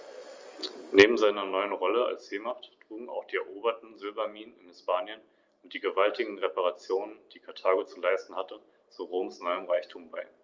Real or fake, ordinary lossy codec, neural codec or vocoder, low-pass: real; Opus, 32 kbps; none; 7.2 kHz